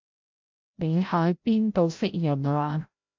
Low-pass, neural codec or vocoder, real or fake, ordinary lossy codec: 7.2 kHz; codec, 16 kHz, 0.5 kbps, FreqCodec, larger model; fake; MP3, 48 kbps